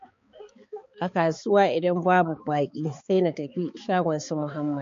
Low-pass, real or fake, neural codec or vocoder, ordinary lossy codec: 7.2 kHz; fake; codec, 16 kHz, 4 kbps, X-Codec, HuBERT features, trained on balanced general audio; MP3, 48 kbps